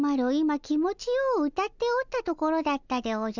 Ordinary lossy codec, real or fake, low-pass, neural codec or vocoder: none; real; 7.2 kHz; none